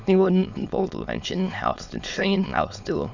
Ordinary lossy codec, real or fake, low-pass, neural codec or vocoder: Opus, 64 kbps; fake; 7.2 kHz; autoencoder, 22.05 kHz, a latent of 192 numbers a frame, VITS, trained on many speakers